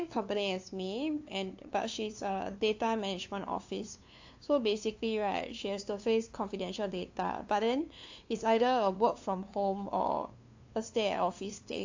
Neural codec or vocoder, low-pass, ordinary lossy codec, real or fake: codec, 16 kHz, 2 kbps, FunCodec, trained on LibriTTS, 25 frames a second; 7.2 kHz; AAC, 48 kbps; fake